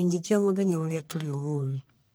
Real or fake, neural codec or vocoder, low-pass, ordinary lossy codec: fake; codec, 44.1 kHz, 1.7 kbps, Pupu-Codec; none; none